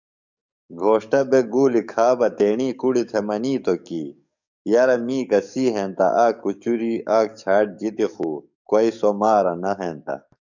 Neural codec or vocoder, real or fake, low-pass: codec, 44.1 kHz, 7.8 kbps, DAC; fake; 7.2 kHz